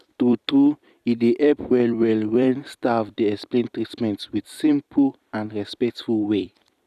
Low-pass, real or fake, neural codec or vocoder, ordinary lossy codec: 14.4 kHz; fake; vocoder, 44.1 kHz, 128 mel bands every 256 samples, BigVGAN v2; none